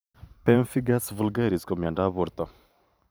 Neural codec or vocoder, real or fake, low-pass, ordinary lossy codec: vocoder, 44.1 kHz, 128 mel bands every 512 samples, BigVGAN v2; fake; none; none